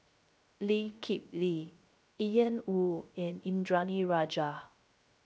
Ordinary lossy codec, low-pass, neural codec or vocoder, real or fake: none; none; codec, 16 kHz, 0.3 kbps, FocalCodec; fake